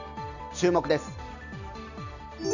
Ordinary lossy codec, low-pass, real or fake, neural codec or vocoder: none; 7.2 kHz; real; none